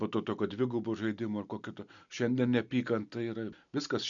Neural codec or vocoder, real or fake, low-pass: none; real; 7.2 kHz